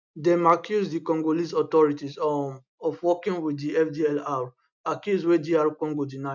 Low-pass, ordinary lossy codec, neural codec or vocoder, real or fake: 7.2 kHz; none; none; real